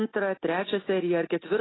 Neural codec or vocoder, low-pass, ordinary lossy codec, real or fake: none; 7.2 kHz; AAC, 16 kbps; real